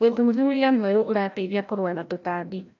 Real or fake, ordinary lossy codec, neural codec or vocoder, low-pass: fake; AAC, 48 kbps; codec, 16 kHz, 0.5 kbps, FreqCodec, larger model; 7.2 kHz